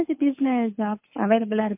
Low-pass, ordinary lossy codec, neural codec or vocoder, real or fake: 3.6 kHz; MP3, 32 kbps; codec, 24 kHz, 6 kbps, HILCodec; fake